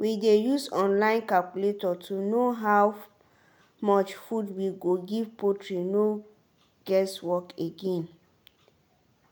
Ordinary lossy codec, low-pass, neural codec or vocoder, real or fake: none; none; none; real